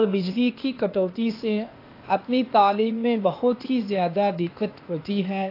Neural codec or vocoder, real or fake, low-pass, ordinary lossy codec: codec, 16 kHz, 0.8 kbps, ZipCodec; fake; 5.4 kHz; AAC, 32 kbps